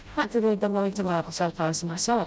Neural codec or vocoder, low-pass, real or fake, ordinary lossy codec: codec, 16 kHz, 0.5 kbps, FreqCodec, smaller model; none; fake; none